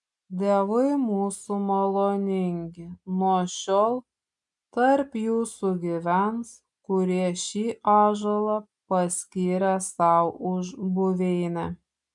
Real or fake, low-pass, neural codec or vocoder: real; 10.8 kHz; none